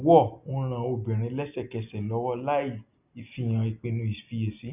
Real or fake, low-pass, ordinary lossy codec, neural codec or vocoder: real; 3.6 kHz; none; none